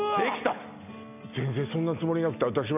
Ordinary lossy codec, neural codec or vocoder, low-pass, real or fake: none; none; 3.6 kHz; real